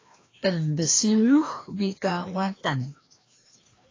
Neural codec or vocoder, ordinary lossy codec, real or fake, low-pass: codec, 16 kHz, 1 kbps, FreqCodec, larger model; AAC, 32 kbps; fake; 7.2 kHz